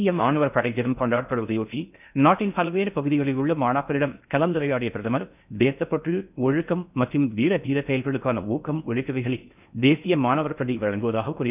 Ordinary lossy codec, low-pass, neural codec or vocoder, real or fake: none; 3.6 kHz; codec, 16 kHz in and 24 kHz out, 0.6 kbps, FocalCodec, streaming, 4096 codes; fake